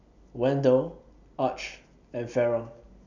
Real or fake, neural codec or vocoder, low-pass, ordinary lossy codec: real; none; 7.2 kHz; none